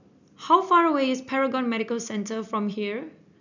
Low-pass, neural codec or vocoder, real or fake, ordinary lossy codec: 7.2 kHz; none; real; none